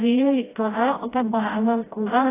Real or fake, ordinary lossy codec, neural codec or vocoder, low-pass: fake; AAC, 16 kbps; codec, 16 kHz, 0.5 kbps, FreqCodec, smaller model; 3.6 kHz